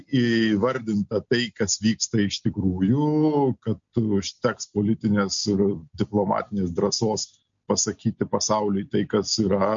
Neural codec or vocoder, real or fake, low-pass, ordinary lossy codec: none; real; 7.2 kHz; MP3, 48 kbps